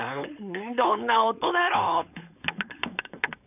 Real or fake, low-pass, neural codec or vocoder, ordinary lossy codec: fake; 3.6 kHz; codec, 16 kHz, 4.8 kbps, FACodec; none